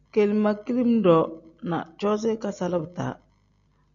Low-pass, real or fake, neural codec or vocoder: 7.2 kHz; real; none